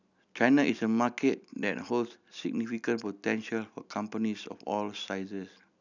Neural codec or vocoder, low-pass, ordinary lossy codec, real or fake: none; 7.2 kHz; none; real